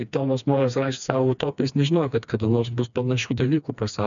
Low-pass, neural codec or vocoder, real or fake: 7.2 kHz; codec, 16 kHz, 2 kbps, FreqCodec, smaller model; fake